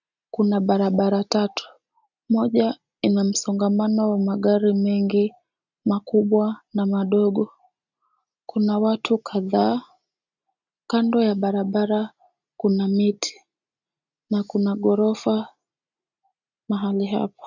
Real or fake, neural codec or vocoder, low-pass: real; none; 7.2 kHz